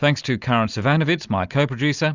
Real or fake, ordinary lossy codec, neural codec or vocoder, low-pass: real; Opus, 64 kbps; none; 7.2 kHz